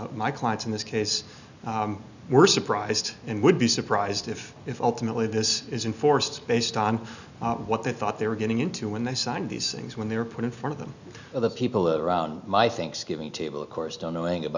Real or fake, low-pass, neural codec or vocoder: real; 7.2 kHz; none